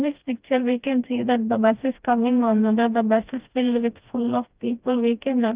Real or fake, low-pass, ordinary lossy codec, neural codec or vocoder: fake; 3.6 kHz; Opus, 24 kbps; codec, 16 kHz, 1 kbps, FreqCodec, smaller model